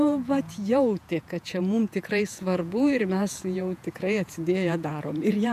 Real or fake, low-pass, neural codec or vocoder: fake; 14.4 kHz; vocoder, 48 kHz, 128 mel bands, Vocos